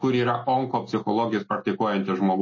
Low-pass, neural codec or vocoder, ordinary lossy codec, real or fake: 7.2 kHz; none; MP3, 32 kbps; real